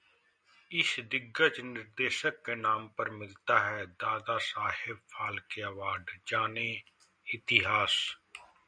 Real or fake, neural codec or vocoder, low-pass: real; none; 9.9 kHz